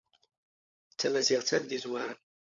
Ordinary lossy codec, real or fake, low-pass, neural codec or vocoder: MP3, 48 kbps; fake; 7.2 kHz; codec, 16 kHz, 16 kbps, FunCodec, trained on LibriTTS, 50 frames a second